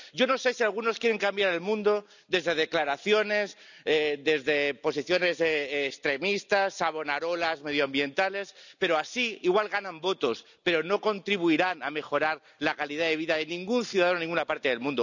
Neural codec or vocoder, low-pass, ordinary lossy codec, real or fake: none; 7.2 kHz; none; real